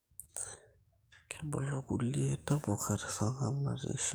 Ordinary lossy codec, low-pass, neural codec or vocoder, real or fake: none; none; codec, 44.1 kHz, 7.8 kbps, DAC; fake